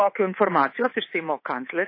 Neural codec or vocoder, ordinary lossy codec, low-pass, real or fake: codec, 24 kHz, 3.1 kbps, DualCodec; MP3, 24 kbps; 5.4 kHz; fake